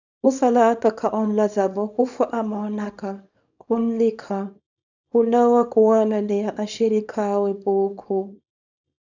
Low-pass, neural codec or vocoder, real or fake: 7.2 kHz; codec, 24 kHz, 0.9 kbps, WavTokenizer, small release; fake